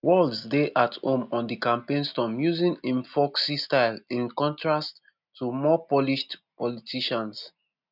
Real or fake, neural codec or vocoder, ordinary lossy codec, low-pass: real; none; none; 5.4 kHz